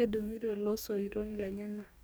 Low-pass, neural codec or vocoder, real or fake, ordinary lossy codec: none; codec, 44.1 kHz, 2.6 kbps, DAC; fake; none